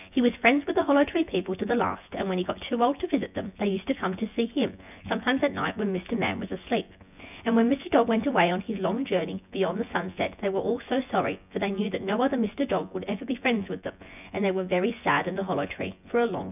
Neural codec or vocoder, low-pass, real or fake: vocoder, 24 kHz, 100 mel bands, Vocos; 3.6 kHz; fake